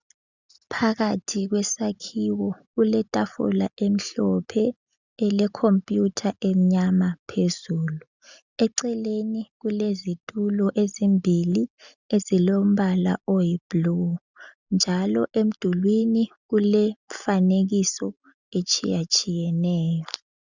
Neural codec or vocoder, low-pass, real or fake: none; 7.2 kHz; real